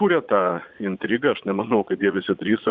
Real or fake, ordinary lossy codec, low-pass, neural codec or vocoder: fake; Opus, 64 kbps; 7.2 kHz; vocoder, 22.05 kHz, 80 mel bands, Vocos